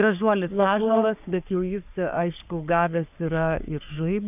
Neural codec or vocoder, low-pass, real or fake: codec, 44.1 kHz, 3.4 kbps, Pupu-Codec; 3.6 kHz; fake